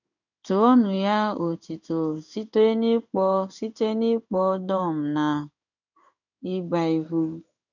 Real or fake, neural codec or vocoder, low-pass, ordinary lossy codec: fake; codec, 16 kHz in and 24 kHz out, 1 kbps, XY-Tokenizer; 7.2 kHz; none